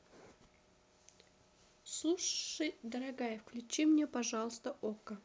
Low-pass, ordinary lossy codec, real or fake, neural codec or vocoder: none; none; real; none